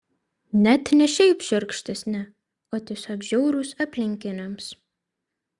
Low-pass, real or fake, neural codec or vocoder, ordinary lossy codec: 10.8 kHz; real; none; Opus, 64 kbps